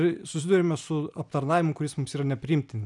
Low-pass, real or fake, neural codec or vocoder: 10.8 kHz; real; none